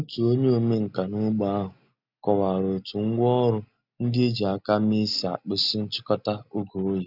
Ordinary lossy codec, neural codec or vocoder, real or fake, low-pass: none; none; real; 5.4 kHz